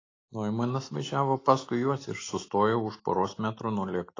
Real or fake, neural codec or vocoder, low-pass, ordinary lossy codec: real; none; 7.2 kHz; AAC, 32 kbps